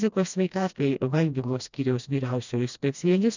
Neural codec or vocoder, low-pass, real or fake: codec, 16 kHz, 1 kbps, FreqCodec, smaller model; 7.2 kHz; fake